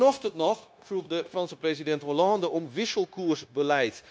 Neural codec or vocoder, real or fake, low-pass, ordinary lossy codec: codec, 16 kHz, 0.9 kbps, LongCat-Audio-Codec; fake; none; none